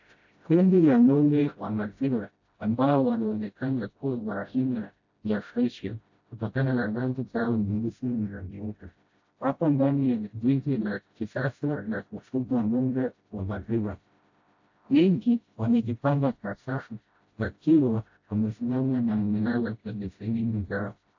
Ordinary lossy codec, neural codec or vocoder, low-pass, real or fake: AAC, 48 kbps; codec, 16 kHz, 0.5 kbps, FreqCodec, smaller model; 7.2 kHz; fake